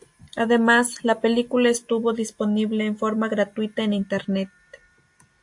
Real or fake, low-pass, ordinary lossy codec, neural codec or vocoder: real; 10.8 kHz; AAC, 64 kbps; none